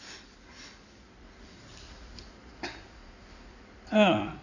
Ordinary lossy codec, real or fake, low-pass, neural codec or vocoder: none; fake; 7.2 kHz; codec, 16 kHz in and 24 kHz out, 2.2 kbps, FireRedTTS-2 codec